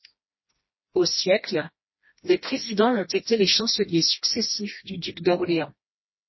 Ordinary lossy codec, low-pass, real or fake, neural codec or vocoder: MP3, 24 kbps; 7.2 kHz; fake; codec, 16 kHz, 1 kbps, FreqCodec, smaller model